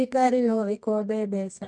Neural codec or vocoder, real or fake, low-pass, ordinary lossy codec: codec, 24 kHz, 0.9 kbps, WavTokenizer, medium music audio release; fake; none; none